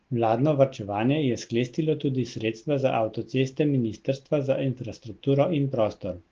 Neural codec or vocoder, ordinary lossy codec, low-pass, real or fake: none; Opus, 16 kbps; 7.2 kHz; real